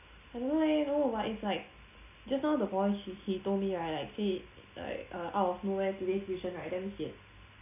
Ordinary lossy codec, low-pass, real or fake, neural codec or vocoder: none; 3.6 kHz; real; none